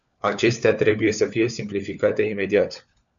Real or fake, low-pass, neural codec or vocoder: fake; 7.2 kHz; codec, 16 kHz, 4 kbps, FunCodec, trained on LibriTTS, 50 frames a second